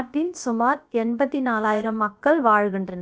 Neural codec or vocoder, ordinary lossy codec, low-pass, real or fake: codec, 16 kHz, about 1 kbps, DyCAST, with the encoder's durations; none; none; fake